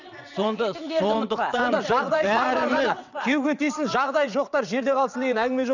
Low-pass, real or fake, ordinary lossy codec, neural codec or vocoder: 7.2 kHz; fake; none; vocoder, 22.05 kHz, 80 mel bands, WaveNeXt